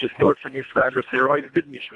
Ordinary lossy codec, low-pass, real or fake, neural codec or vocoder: AAC, 64 kbps; 10.8 kHz; fake; codec, 24 kHz, 1.5 kbps, HILCodec